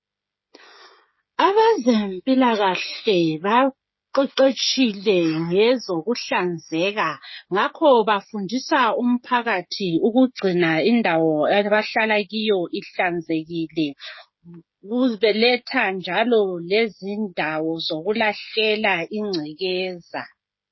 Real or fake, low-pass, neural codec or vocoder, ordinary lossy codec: fake; 7.2 kHz; codec, 16 kHz, 16 kbps, FreqCodec, smaller model; MP3, 24 kbps